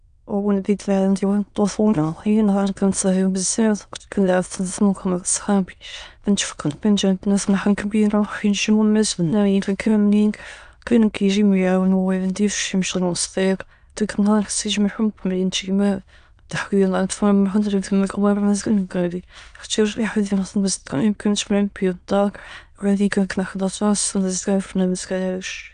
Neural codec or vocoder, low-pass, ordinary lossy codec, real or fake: autoencoder, 22.05 kHz, a latent of 192 numbers a frame, VITS, trained on many speakers; 9.9 kHz; none; fake